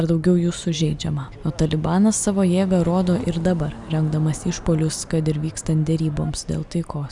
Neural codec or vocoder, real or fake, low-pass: none; real; 10.8 kHz